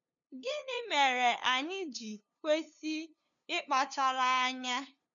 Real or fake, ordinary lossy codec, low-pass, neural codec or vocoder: fake; none; 7.2 kHz; codec, 16 kHz, 2 kbps, FunCodec, trained on LibriTTS, 25 frames a second